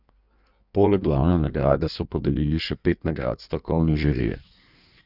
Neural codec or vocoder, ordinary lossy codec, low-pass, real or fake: codec, 16 kHz in and 24 kHz out, 1.1 kbps, FireRedTTS-2 codec; none; 5.4 kHz; fake